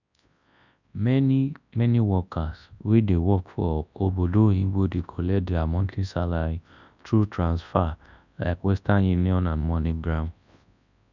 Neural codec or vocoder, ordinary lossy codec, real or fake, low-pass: codec, 24 kHz, 0.9 kbps, WavTokenizer, large speech release; none; fake; 7.2 kHz